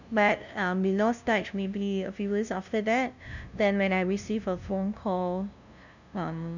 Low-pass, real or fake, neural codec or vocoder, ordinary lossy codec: 7.2 kHz; fake; codec, 16 kHz, 0.5 kbps, FunCodec, trained on LibriTTS, 25 frames a second; none